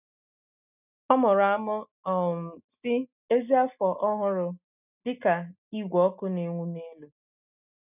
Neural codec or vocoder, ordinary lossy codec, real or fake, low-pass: none; none; real; 3.6 kHz